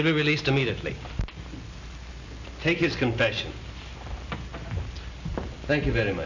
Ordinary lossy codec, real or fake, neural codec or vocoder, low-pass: AAC, 48 kbps; real; none; 7.2 kHz